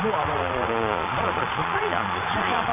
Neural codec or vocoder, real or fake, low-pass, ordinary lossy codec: vocoder, 22.05 kHz, 80 mel bands, Vocos; fake; 3.6 kHz; MP3, 24 kbps